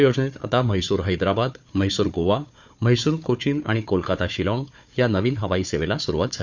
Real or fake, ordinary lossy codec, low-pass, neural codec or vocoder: fake; none; 7.2 kHz; codec, 16 kHz, 4 kbps, FunCodec, trained on Chinese and English, 50 frames a second